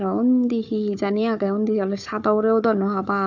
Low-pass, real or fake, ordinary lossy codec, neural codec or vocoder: 7.2 kHz; fake; none; codec, 16 kHz, 4 kbps, FunCodec, trained on Chinese and English, 50 frames a second